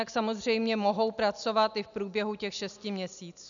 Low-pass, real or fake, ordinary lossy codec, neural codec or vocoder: 7.2 kHz; real; MP3, 96 kbps; none